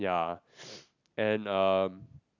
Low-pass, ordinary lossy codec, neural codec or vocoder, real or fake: 7.2 kHz; none; none; real